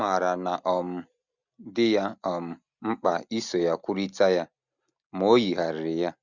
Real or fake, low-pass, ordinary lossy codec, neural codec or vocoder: real; 7.2 kHz; none; none